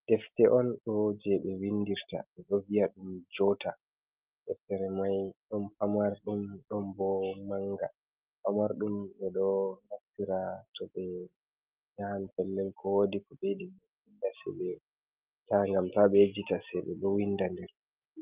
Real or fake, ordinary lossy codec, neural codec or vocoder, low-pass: real; Opus, 24 kbps; none; 3.6 kHz